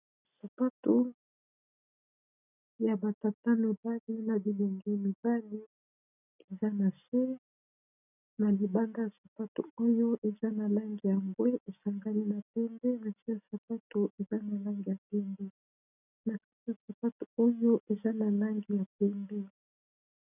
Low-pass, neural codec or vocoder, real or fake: 3.6 kHz; none; real